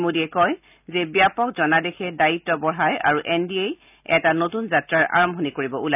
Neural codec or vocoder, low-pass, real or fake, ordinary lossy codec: none; 3.6 kHz; real; none